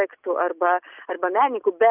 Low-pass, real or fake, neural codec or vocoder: 3.6 kHz; real; none